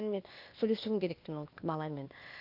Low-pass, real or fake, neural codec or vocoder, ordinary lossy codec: 5.4 kHz; fake; codec, 16 kHz, 0.8 kbps, ZipCodec; none